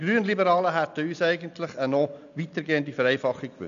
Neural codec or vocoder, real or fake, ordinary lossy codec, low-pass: none; real; none; 7.2 kHz